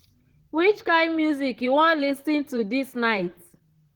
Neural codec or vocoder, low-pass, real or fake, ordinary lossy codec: vocoder, 44.1 kHz, 128 mel bands, Pupu-Vocoder; 19.8 kHz; fake; Opus, 16 kbps